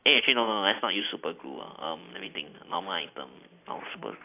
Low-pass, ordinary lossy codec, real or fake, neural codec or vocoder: 3.6 kHz; AAC, 24 kbps; real; none